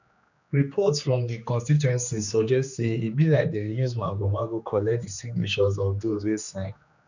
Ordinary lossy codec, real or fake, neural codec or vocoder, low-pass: none; fake; codec, 16 kHz, 2 kbps, X-Codec, HuBERT features, trained on general audio; 7.2 kHz